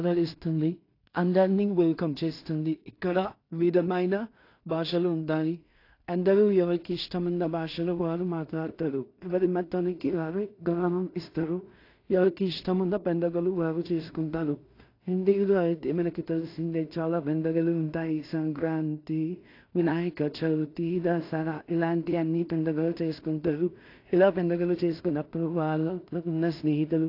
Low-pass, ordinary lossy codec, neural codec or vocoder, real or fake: 5.4 kHz; AAC, 32 kbps; codec, 16 kHz in and 24 kHz out, 0.4 kbps, LongCat-Audio-Codec, two codebook decoder; fake